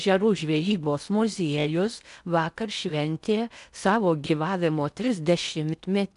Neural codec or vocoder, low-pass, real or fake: codec, 16 kHz in and 24 kHz out, 0.6 kbps, FocalCodec, streaming, 4096 codes; 10.8 kHz; fake